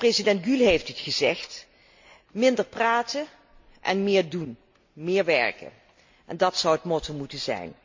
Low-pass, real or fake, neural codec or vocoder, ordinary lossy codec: 7.2 kHz; real; none; MP3, 48 kbps